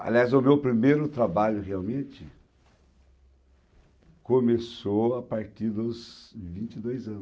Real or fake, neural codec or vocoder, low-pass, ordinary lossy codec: real; none; none; none